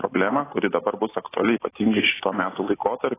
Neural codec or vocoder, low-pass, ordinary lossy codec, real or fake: none; 3.6 kHz; AAC, 16 kbps; real